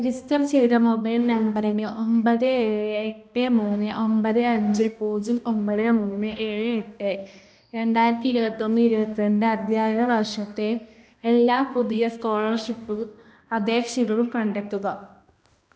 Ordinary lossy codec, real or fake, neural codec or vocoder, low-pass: none; fake; codec, 16 kHz, 1 kbps, X-Codec, HuBERT features, trained on balanced general audio; none